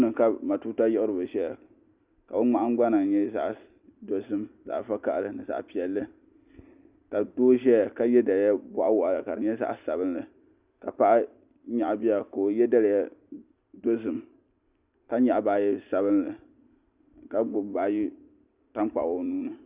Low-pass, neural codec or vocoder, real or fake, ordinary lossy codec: 3.6 kHz; none; real; Opus, 64 kbps